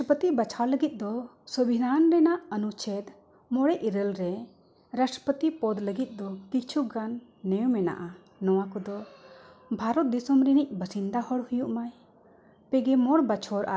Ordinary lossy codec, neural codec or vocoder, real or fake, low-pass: none; none; real; none